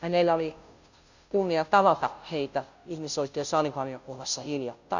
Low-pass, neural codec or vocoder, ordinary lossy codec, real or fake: 7.2 kHz; codec, 16 kHz, 0.5 kbps, FunCodec, trained on Chinese and English, 25 frames a second; none; fake